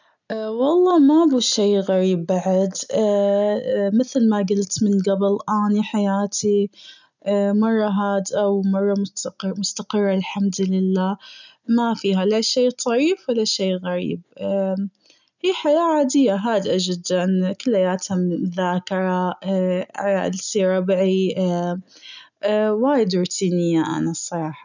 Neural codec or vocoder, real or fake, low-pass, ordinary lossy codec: none; real; 7.2 kHz; none